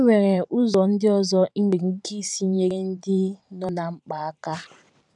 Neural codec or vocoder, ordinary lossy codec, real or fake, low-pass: vocoder, 44.1 kHz, 128 mel bands every 512 samples, BigVGAN v2; none; fake; 10.8 kHz